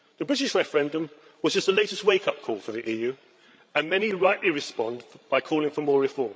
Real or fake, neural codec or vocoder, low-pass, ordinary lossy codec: fake; codec, 16 kHz, 16 kbps, FreqCodec, larger model; none; none